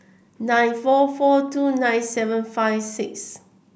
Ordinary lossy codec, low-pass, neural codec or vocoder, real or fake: none; none; none; real